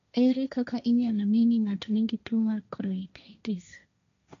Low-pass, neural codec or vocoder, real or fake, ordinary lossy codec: 7.2 kHz; codec, 16 kHz, 1.1 kbps, Voila-Tokenizer; fake; none